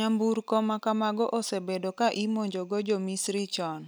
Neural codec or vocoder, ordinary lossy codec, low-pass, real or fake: none; none; none; real